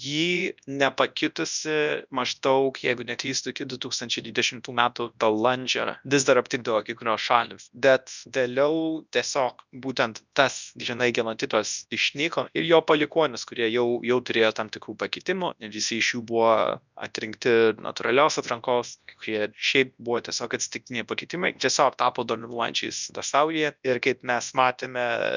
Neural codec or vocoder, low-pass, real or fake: codec, 24 kHz, 0.9 kbps, WavTokenizer, large speech release; 7.2 kHz; fake